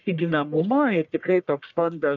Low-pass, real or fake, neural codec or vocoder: 7.2 kHz; fake; codec, 44.1 kHz, 1.7 kbps, Pupu-Codec